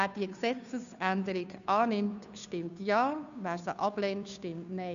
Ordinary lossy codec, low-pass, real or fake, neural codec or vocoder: none; 7.2 kHz; fake; codec, 16 kHz, 2 kbps, FunCodec, trained on Chinese and English, 25 frames a second